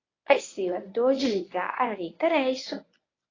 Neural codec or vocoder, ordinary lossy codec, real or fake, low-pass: codec, 24 kHz, 0.9 kbps, WavTokenizer, medium speech release version 1; AAC, 32 kbps; fake; 7.2 kHz